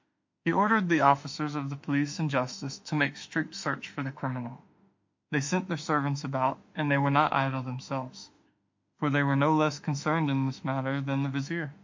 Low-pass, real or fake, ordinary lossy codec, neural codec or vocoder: 7.2 kHz; fake; MP3, 48 kbps; autoencoder, 48 kHz, 32 numbers a frame, DAC-VAE, trained on Japanese speech